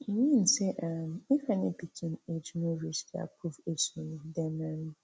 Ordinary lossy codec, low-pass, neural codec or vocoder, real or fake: none; none; none; real